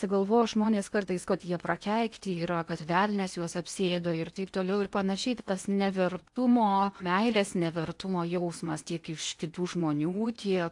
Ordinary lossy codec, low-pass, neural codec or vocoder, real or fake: AAC, 64 kbps; 10.8 kHz; codec, 16 kHz in and 24 kHz out, 0.8 kbps, FocalCodec, streaming, 65536 codes; fake